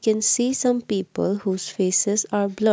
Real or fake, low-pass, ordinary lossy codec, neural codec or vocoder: real; none; none; none